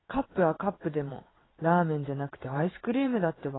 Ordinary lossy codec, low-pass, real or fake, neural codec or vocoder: AAC, 16 kbps; 7.2 kHz; fake; vocoder, 22.05 kHz, 80 mel bands, WaveNeXt